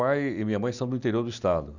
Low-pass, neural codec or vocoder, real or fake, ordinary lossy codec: 7.2 kHz; none; real; none